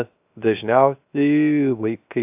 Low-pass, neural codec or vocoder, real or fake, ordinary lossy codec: 3.6 kHz; codec, 16 kHz, 0.3 kbps, FocalCodec; fake; AAC, 32 kbps